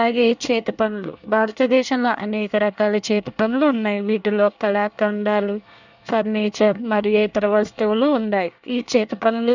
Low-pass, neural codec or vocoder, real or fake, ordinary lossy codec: 7.2 kHz; codec, 24 kHz, 1 kbps, SNAC; fake; none